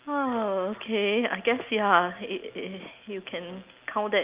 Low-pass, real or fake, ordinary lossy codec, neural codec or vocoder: 3.6 kHz; real; Opus, 24 kbps; none